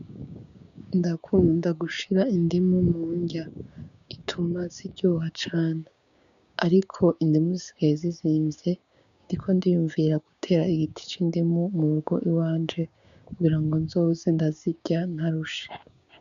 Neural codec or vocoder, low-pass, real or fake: codec, 16 kHz, 6 kbps, DAC; 7.2 kHz; fake